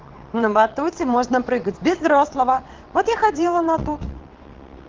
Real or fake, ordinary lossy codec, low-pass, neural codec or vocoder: fake; Opus, 16 kbps; 7.2 kHz; vocoder, 22.05 kHz, 80 mel bands, WaveNeXt